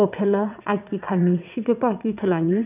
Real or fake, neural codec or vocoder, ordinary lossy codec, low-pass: fake; vocoder, 22.05 kHz, 80 mel bands, WaveNeXt; none; 3.6 kHz